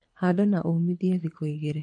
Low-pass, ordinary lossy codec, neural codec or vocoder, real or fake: 9.9 kHz; MP3, 48 kbps; codec, 24 kHz, 6 kbps, HILCodec; fake